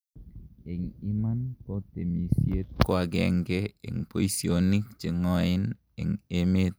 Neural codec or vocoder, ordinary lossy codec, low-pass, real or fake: none; none; none; real